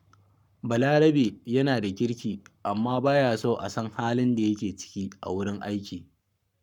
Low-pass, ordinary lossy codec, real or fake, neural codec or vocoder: 19.8 kHz; none; fake; codec, 44.1 kHz, 7.8 kbps, Pupu-Codec